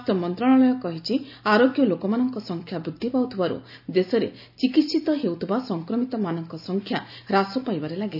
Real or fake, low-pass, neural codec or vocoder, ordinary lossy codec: real; 5.4 kHz; none; none